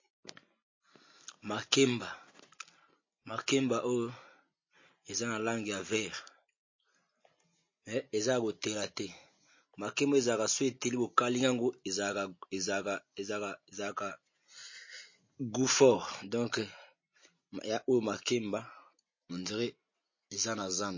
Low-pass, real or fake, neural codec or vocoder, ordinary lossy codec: 7.2 kHz; real; none; MP3, 32 kbps